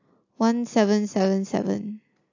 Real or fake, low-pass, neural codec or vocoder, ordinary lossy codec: real; 7.2 kHz; none; AAC, 48 kbps